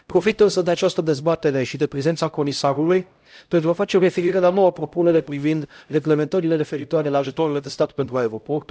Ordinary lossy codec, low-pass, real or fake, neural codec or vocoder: none; none; fake; codec, 16 kHz, 0.5 kbps, X-Codec, HuBERT features, trained on LibriSpeech